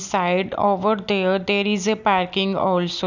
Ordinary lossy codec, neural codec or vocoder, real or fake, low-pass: none; none; real; 7.2 kHz